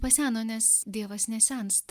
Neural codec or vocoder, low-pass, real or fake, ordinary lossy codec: none; 14.4 kHz; real; Opus, 32 kbps